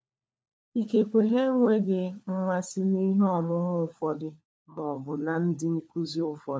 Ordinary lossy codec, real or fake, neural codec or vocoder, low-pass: none; fake; codec, 16 kHz, 4 kbps, FunCodec, trained on LibriTTS, 50 frames a second; none